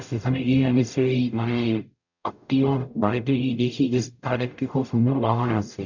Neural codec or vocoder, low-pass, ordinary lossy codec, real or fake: codec, 44.1 kHz, 0.9 kbps, DAC; 7.2 kHz; none; fake